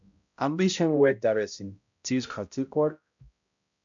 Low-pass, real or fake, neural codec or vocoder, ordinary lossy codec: 7.2 kHz; fake; codec, 16 kHz, 0.5 kbps, X-Codec, HuBERT features, trained on balanced general audio; MP3, 64 kbps